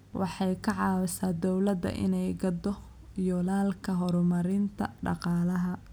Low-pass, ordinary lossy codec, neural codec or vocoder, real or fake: none; none; none; real